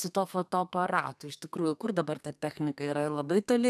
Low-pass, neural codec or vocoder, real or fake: 14.4 kHz; codec, 32 kHz, 1.9 kbps, SNAC; fake